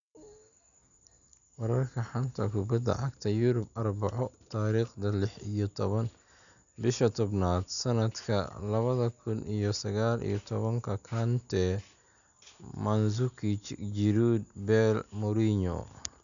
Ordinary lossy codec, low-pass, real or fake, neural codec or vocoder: none; 7.2 kHz; real; none